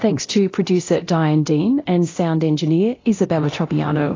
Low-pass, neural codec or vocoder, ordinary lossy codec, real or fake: 7.2 kHz; codec, 24 kHz, 0.9 kbps, DualCodec; AAC, 32 kbps; fake